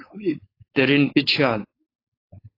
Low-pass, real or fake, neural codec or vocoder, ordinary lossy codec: 5.4 kHz; fake; codec, 16 kHz, 4.8 kbps, FACodec; AAC, 24 kbps